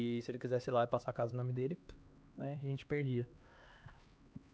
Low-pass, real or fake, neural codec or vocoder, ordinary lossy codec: none; fake; codec, 16 kHz, 2 kbps, X-Codec, HuBERT features, trained on LibriSpeech; none